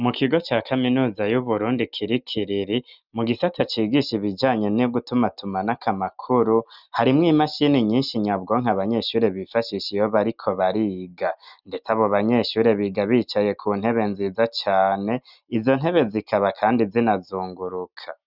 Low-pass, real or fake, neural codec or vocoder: 5.4 kHz; real; none